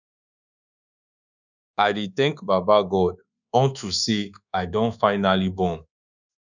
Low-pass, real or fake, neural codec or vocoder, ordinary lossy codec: 7.2 kHz; fake; codec, 24 kHz, 1.2 kbps, DualCodec; none